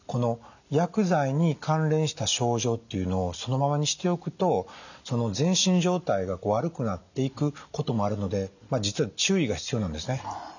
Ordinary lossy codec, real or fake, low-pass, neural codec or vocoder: none; real; 7.2 kHz; none